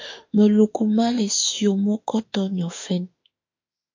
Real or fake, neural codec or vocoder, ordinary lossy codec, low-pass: fake; autoencoder, 48 kHz, 32 numbers a frame, DAC-VAE, trained on Japanese speech; MP3, 48 kbps; 7.2 kHz